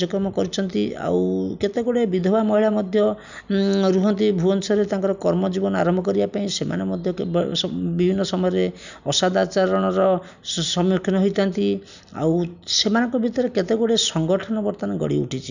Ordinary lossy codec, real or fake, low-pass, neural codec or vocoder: none; real; 7.2 kHz; none